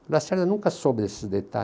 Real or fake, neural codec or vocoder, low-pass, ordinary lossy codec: real; none; none; none